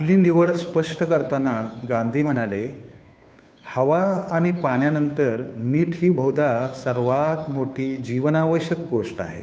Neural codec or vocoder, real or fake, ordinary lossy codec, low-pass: codec, 16 kHz, 2 kbps, FunCodec, trained on Chinese and English, 25 frames a second; fake; none; none